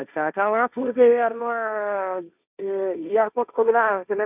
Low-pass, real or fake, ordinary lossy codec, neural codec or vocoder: 3.6 kHz; fake; none; codec, 16 kHz, 1.1 kbps, Voila-Tokenizer